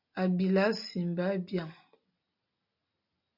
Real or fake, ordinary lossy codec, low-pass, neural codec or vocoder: real; MP3, 48 kbps; 5.4 kHz; none